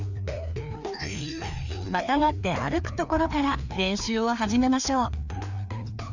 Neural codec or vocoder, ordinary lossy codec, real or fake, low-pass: codec, 16 kHz, 2 kbps, FreqCodec, larger model; none; fake; 7.2 kHz